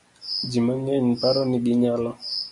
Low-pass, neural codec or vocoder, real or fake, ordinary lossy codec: 10.8 kHz; none; real; MP3, 64 kbps